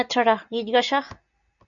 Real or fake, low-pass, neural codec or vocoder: real; 7.2 kHz; none